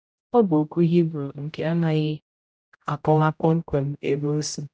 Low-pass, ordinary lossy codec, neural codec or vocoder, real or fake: none; none; codec, 16 kHz, 0.5 kbps, X-Codec, HuBERT features, trained on general audio; fake